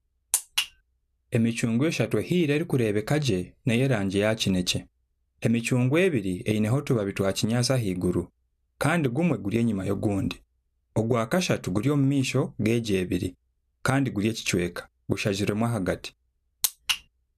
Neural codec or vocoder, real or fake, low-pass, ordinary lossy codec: none; real; 14.4 kHz; none